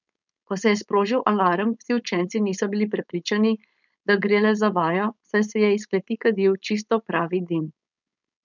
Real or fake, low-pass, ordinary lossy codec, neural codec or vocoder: fake; 7.2 kHz; none; codec, 16 kHz, 4.8 kbps, FACodec